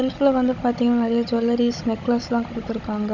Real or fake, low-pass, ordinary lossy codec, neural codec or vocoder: fake; 7.2 kHz; none; codec, 16 kHz, 16 kbps, FunCodec, trained on Chinese and English, 50 frames a second